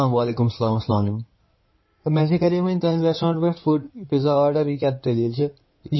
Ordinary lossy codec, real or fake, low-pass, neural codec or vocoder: MP3, 24 kbps; fake; 7.2 kHz; codec, 16 kHz in and 24 kHz out, 2.2 kbps, FireRedTTS-2 codec